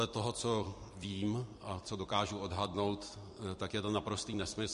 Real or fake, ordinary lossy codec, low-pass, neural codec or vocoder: fake; MP3, 48 kbps; 14.4 kHz; vocoder, 44.1 kHz, 128 mel bands every 256 samples, BigVGAN v2